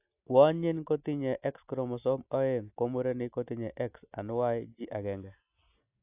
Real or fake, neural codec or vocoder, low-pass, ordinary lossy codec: real; none; 3.6 kHz; AAC, 32 kbps